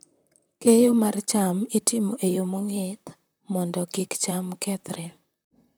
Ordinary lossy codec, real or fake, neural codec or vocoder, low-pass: none; fake; vocoder, 44.1 kHz, 128 mel bands, Pupu-Vocoder; none